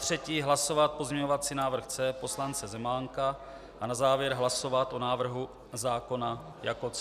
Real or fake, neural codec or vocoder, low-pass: real; none; 14.4 kHz